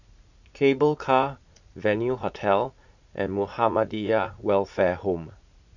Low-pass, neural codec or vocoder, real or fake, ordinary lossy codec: 7.2 kHz; vocoder, 44.1 kHz, 80 mel bands, Vocos; fake; none